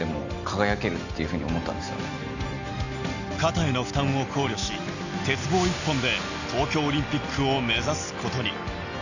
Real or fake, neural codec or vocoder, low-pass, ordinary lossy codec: real; none; 7.2 kHz; none